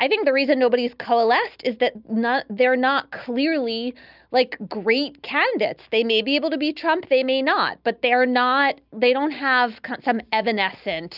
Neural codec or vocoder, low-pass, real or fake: none; 5.4 kHz; real